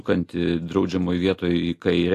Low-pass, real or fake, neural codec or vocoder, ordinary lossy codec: 14.4 kHz; real; none; AAC, 64 kbps